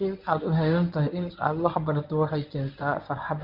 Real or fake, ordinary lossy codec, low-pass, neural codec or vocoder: fake; none; 5.4 kHz; codec, 24 kHz, 0.9 kbps, WavTokenizer, medium speech release version 1